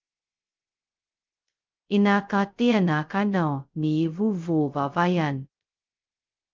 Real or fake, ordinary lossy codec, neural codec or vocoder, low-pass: fake; Opus, 24 kbps; codec, 16 kHz, 0.2 kbps, FocalCodec; 7.2 kHz